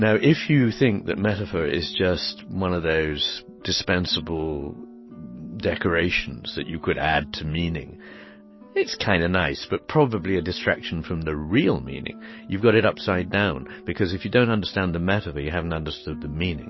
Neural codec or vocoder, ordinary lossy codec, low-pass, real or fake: none; MP3, 24 kbps; 7.2 kHz; real